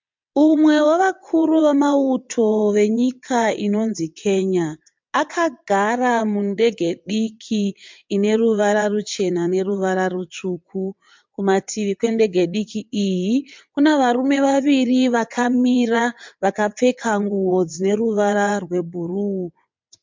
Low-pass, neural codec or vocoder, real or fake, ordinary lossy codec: 7.2 kHz; vocoder, 22.05 kHz, 80 mel bands, WaveNeXt; fake; MP3, 64 kbps